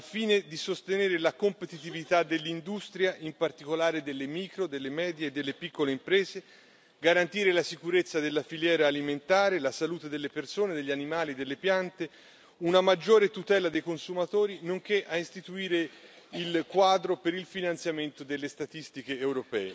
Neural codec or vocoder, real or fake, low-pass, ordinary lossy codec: none; real; none; none